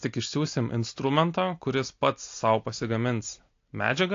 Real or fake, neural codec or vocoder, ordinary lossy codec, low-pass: real; none; AAC, 48 kbps; 7.2 kHz